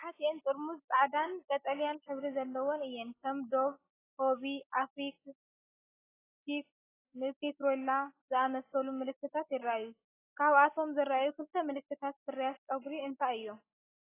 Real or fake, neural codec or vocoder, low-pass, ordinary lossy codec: real; none; 3.6 kHz; AAC, 16 kbps